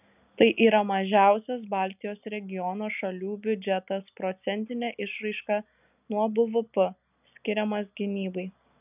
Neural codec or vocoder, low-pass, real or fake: none; 3.6 kHz; real